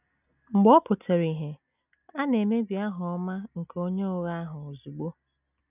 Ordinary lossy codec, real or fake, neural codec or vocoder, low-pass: none; real; none; 3.6 kHz